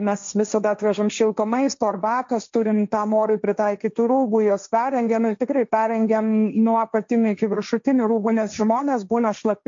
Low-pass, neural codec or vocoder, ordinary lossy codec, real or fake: 7.2 kHz; codec, 16 kHz, 1.1 kbps, Voila-Tokenizer; MP3, 48 kbps; fake